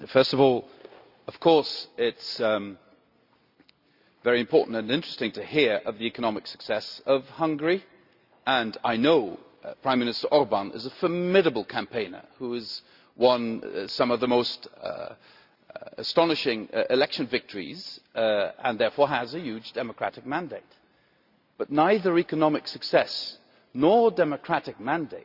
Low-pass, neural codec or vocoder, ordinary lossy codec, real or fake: 5.4 kHz; none; Opus, 64 kbps; real